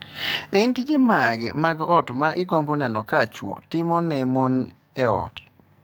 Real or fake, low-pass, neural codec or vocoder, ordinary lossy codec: fake; none; codec, 44.1 kHz, 2.6 kbps, SNAC; none